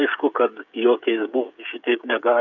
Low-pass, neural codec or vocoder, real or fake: 7.2 kHz; codec, 16 kHz, 16 kbps, FreqCodec, smaller model; fake